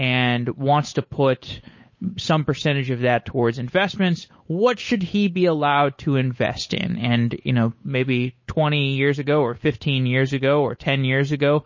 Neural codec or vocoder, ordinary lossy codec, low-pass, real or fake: codec, 16 kHz, 8 kbps, FunCodec, trained on Chinese and English, 25 frames a second; MP3, 32 kbps; 7.2 kHz; fake